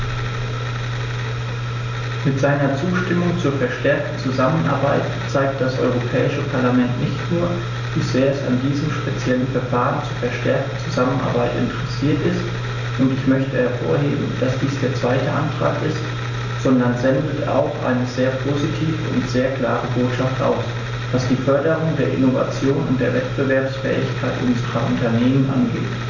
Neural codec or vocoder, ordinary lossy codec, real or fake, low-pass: none; none; real; 7.2 kHz